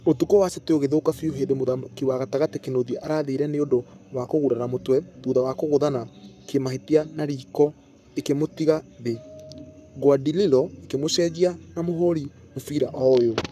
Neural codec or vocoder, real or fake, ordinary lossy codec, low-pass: codec, 44.1 kHz, 7.8 kbps, Pupu-Codec; fake; none; 14.4 kHz